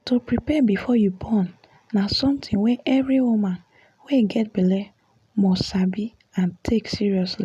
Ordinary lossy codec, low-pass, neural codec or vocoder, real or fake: none; 14.4 kHz; none; real